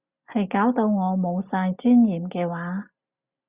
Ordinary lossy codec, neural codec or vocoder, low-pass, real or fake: Opus, 64 kbps; none; 3.6 kHz; real